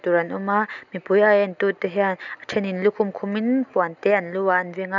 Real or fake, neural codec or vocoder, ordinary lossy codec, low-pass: real; none; none; 7.2 kHz